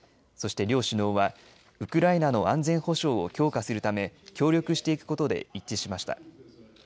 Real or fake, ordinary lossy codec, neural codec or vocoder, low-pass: real; none; none; none